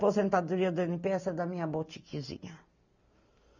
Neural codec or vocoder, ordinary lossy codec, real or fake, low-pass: none; none; real; 7.2 kHz